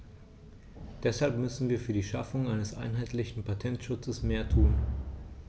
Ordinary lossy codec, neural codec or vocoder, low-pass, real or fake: none; none; none; real